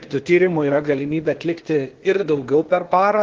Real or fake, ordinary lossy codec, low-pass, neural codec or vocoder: fake; Opus, 16 kbps; 7.2 kHz; codec, 16 kHz, 0.8 kbps, ZipCodec